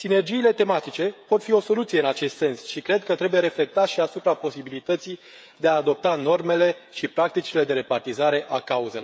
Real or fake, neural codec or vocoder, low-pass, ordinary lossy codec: fake; codec, 16 kHz, 16 kbps, FreqCodec, smaller model; none; none